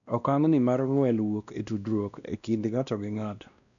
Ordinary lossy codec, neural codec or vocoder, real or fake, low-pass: none; codec, 16 kHz, 1 kbps, X-Codec, WavLM features, trained on Multilingual LibriSpeech; fake; 7.2 kHz